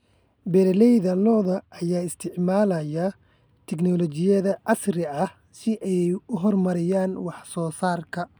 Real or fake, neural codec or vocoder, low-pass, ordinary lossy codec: real; none; none; none